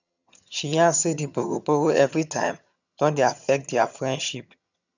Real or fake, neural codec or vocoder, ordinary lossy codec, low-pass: fake; vocoder, 22.05 kHz, 80 mel bands, HiFi-GAN; none; 7.2 kHz